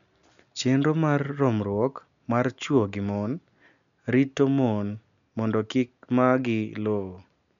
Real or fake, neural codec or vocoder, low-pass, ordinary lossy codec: real; none; 7.2 kHz; none